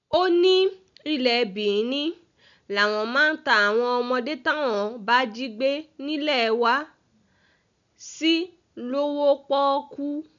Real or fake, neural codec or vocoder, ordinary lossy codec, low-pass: real; none; none; 7.2 kHz